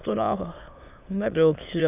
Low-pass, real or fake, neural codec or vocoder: 3.6 kHz; fake; autoencoder, 22.05 kHz, a latent of 192 numbers a frame, VITS, trained on many speakers